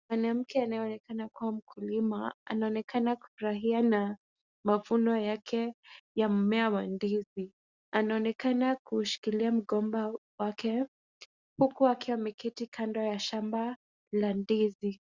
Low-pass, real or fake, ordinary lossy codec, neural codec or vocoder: 7.2 kHz; real; Opus, 64 kbps; none